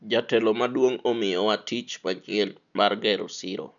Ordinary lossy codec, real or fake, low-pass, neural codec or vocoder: none; real; 7.2 kHz; none